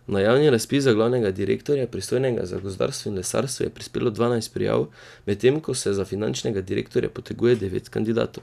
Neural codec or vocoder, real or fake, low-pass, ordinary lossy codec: none; real; 14.4 kHz; none